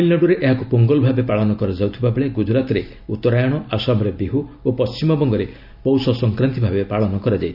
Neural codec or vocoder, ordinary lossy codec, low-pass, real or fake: none; none; 5.4 kHz; real